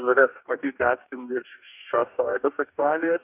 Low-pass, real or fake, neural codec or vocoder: 3.6 kHz; fake; codec, 44.1 kHz, 2.6 kbps, DAC